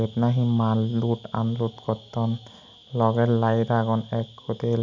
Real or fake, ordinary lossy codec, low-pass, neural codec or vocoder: real; none; 7.2 kHz; none